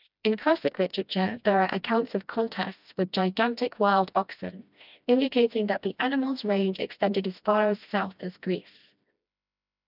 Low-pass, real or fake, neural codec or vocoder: 5.4 kHz; fake; codec, 16 kHz, 1 kbps, FreqCodec, smaller model